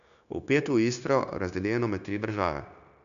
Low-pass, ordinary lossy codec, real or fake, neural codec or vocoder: 7.2 kHz; none; fake; codec, 16 kHz, 0.9 kbps, LongCat-Audio-Codec